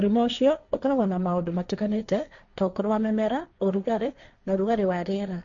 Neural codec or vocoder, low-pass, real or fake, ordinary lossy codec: codec, 16 kHz, 1.1 kbps, Voila-Tokenizer; 7.2 kHz; fake; none